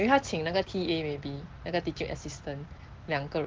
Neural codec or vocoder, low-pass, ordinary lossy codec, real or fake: none; 7.2 kHz; Opus, 16 kbps; real